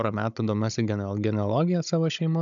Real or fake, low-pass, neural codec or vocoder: fake; 7.2 kHz; codec, 16 kHz, 16 kbps, FunCodec, trained on Chinese and English, 50 frames a second